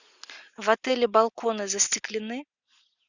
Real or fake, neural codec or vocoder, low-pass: real; none; 7.2 kHz